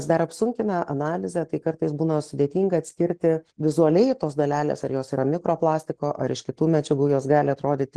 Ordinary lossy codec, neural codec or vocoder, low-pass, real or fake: Opus, 16 kbps; none; 10.8 kHz; real